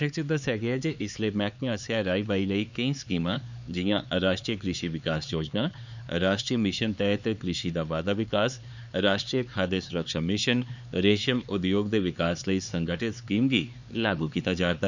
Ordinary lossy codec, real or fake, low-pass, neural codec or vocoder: none; fake; 7.2 kHz; codec, 16 kHz, 4 kbps, X-Codec, HuBERT features, trained on LibriSpeech